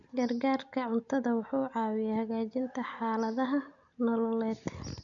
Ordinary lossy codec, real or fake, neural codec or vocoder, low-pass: MP3, 96 kbps; real; none; 7.2 kHz